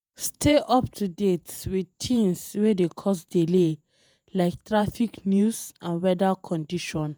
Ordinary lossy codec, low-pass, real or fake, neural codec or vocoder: none; none; fake; vocoder, 48 kHz, 128 mel bands, Vocos